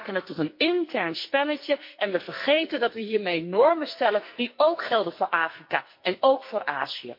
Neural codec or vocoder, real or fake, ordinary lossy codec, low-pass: codec, 44.1 kHz, 2.6 kbps, SNAC; fake; MP3, 32 kbps; 5.4 kHz